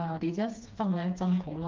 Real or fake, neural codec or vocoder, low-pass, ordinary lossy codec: fake; codec, 16 kHz, 2 kbps, FreqCodec, smaller model; 7.2 kHz; Opus, 24 kbps